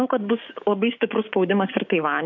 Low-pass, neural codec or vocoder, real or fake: 7.2 kHz; codec, 16 kHz, 6 kbps, DAC; fake